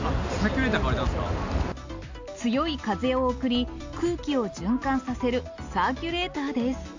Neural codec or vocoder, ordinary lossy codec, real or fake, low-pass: none; AAC, 48 kbps; real; 7.2 kHz